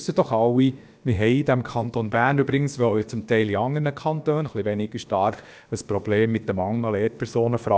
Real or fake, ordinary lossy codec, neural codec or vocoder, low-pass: fake; none; codec, 16 kHz, about 1 kbps, DyCAST, with the encoder's durations; none